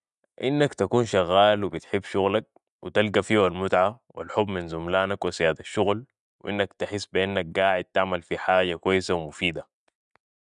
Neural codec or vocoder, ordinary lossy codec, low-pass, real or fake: none; none; 10.8 kHz; real